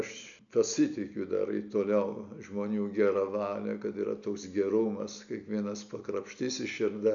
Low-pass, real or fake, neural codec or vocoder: 7.2 kHz; real; none